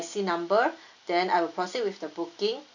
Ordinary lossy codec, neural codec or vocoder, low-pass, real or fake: none; none; 7.2 kHz; real